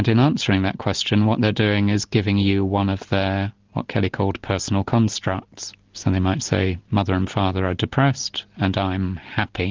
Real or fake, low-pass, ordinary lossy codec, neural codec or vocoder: real; 7.2 kHz; Opus, 24 kbps; none